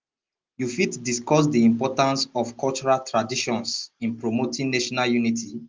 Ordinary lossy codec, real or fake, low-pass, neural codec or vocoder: Opus, 32 kbps; real; 7.2 kHz; none